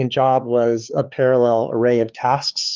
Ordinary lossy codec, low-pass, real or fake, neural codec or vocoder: Opus, 32 kbps; 7.2 kHz; fake; codec, 16 kHz, 4 kbps, X-Codec, HuBERT features, trained on LibriSpeech